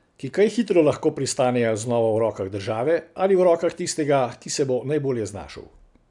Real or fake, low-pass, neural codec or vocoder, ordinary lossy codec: real; 10.8 kHz; none; none